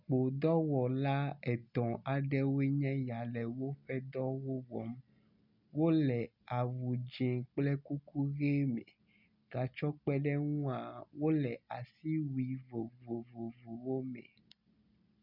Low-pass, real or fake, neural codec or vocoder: 5.4 kHz; real; none